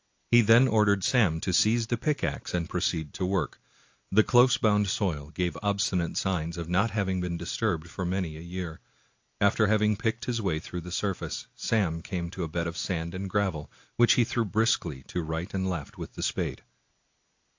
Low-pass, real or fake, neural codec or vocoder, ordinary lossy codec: 7.2 kHz; real; none; AAC, 48 kbps